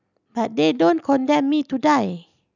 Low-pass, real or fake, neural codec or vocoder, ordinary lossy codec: 7.2 kHz; real; none; none